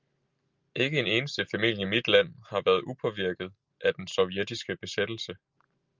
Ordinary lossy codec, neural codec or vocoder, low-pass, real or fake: Opus, 24 kbps; none; 7.2 kHz; real